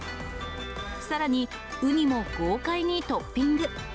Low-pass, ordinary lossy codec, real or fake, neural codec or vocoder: none; none; real; none